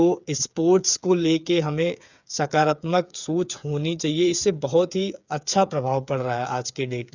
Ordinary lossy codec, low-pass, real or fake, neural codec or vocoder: none; 7.2 kHz; fake; codec, 16 kHz, 8 kbps, FreqCodec, smaller model